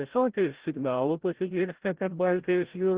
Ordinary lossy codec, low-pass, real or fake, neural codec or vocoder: Opus, 16 kbps; 3.6 kHz; fake; codec, 16 kHz, 0.5 kbps, FreqCodec, larger model